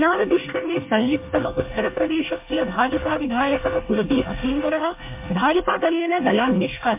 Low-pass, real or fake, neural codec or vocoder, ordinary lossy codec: 3.6 kHz; fake; codec, 24 kHz, 1 kbps, SNAC; MP3, 32 kbps